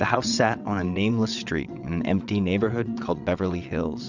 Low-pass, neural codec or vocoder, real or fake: 7.2 kHz; vocoder, 22.05 kHz, 80 mel bands, WaveNeXt; fake